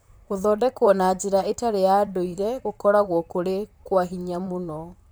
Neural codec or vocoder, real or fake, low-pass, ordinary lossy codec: vocoder, 44.1 kHz, 128 mel bands, Pupu-Vocoder; fake; none; none